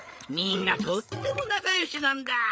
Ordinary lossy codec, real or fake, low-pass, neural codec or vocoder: none; fake; none; codec, 16 kHz, 8 kbps, FreqCodec, larger model